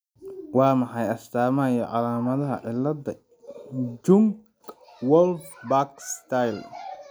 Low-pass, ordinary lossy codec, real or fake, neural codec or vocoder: none; none; real; none